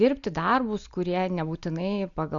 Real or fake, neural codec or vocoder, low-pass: real; none; 7.2 kHz